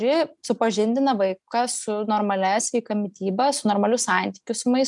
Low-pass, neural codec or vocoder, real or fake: 10.8 kHz; none; real